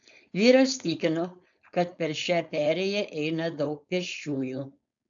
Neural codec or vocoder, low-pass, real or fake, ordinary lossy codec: codec, 16 kHz, 4.8 kbps, FACodec; 7.2 kHz; fake; AAC, 64 kbps